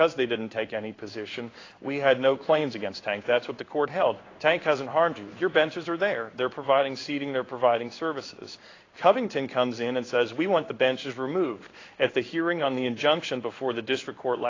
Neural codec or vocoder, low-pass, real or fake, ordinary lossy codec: codec, 16 kHz in and 24 kHz out, 1 kbps, XY-Tokenizer; 7.2 kHz; fake; AAC, 32 kbps